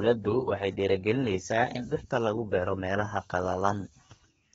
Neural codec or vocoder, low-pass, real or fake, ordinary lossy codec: codec, 32 kHz, 1.9 kbps, SNAC; 14.4 kHz; fake; AAC, 24 kbps